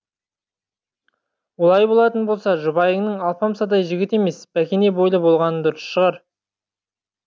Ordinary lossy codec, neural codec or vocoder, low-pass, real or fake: none; none; none; real